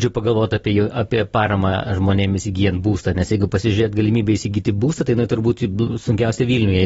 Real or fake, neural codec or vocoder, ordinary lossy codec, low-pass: real; none; AAC, 24 kbps; 19.8 kHz